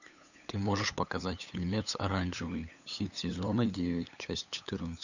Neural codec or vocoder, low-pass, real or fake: codec, 16 kHz, 8 kbps, FunCodec, trained on LibriTTS, 25 frames a second; 7.2 kHz; fake